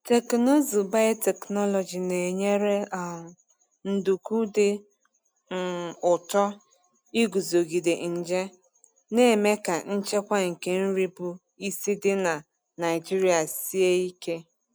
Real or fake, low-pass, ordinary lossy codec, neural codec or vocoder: real; none; none; none